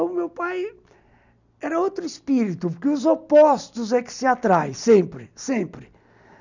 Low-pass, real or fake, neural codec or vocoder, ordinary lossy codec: 7.2 kHz; real; none; none